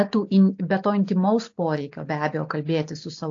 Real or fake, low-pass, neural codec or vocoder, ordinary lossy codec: real; 7.2 kHz; none; AAC, 48 kbps